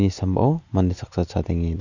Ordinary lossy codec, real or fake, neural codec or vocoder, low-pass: none; real; none; 7.2 kHz